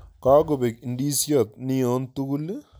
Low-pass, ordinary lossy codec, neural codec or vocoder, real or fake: none; none; none; real